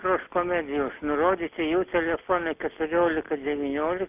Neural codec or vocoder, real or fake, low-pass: none; real; 3.6 kHz